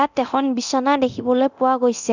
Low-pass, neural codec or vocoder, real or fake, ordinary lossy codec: 7.2 kHz; codec, 24 kHz, 0.9 kbps, DualCodec; fake; none